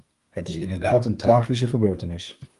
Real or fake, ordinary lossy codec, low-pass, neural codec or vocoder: fake; Opus, 32 kbps; 10.8 kHz; codec, 24 kHz, 1 kbps, SNAC